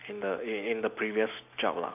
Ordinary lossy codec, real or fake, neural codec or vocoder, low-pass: none; real; none; 3.6 kHz